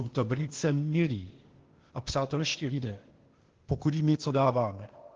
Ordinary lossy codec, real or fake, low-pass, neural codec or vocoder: Opus, 16 kbps; fake; 7.2 kHz; codec, 16 kHz, 0.8 kbps, ZipCodec